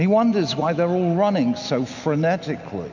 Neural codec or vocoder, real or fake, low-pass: none; real; 7.2 kHz